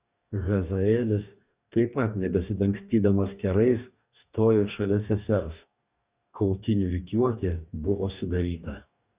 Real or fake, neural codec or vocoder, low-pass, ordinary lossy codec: fake; codec, 44.1 kHz, 2.6 kbps, DAC; 3.6 kHz; Opus, 64 kbps